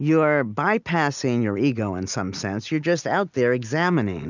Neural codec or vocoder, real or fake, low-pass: none; real; 7.2 kHz